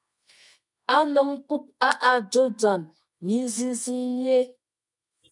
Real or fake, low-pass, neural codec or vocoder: fake; 10.8 kHz; codec, 24 kHz, 0.9 kbps, WavTokenizer, medium music audio release